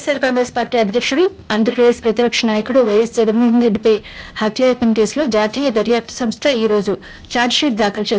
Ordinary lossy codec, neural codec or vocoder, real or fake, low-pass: none; codec, 16 kHz, 0.8 kbps, ZipCodec; fake; none